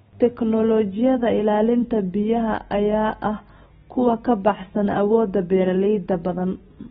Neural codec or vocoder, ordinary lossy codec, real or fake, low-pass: none; AAC, 16 kbps; real; 19.8 kHz